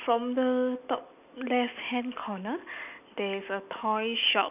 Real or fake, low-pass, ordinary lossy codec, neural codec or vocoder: real; 3.6 kHz; none; none